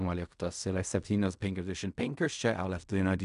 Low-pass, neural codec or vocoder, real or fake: 10.8 kHz; codec, 16 kHz in and 24 kHz out, 0.4 kbps, LongCat-Audio-Codec, fine tuned four codebook decoder; fake